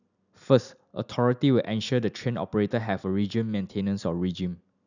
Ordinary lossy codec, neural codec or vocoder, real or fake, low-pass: none; none; real; 7.2 kHz